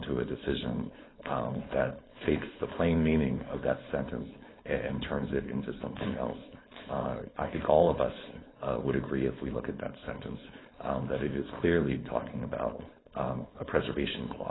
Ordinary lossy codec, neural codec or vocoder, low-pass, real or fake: AAC, 16 kbps; codec, 16 kHz, 4.8 kbps, FACodec; 7.2 kHz; fake